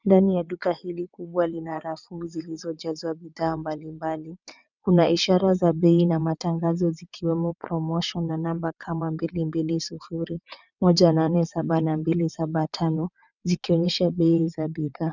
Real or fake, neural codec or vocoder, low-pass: fake; vocoder, 22.05 kHz, 80 mel bands, WaveNeXt; 7.2 kHz